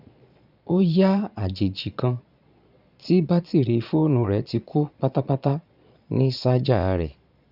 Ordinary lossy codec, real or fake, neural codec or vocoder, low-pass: none; fake; vocoder, 22.05 kHz, 80 mel bands, Vocos; 5.4 kHz